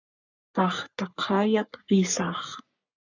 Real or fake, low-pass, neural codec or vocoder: fake; 7.2 kHz; codec, 44.1 kHz, 3.4 kbps, Pupu-Codec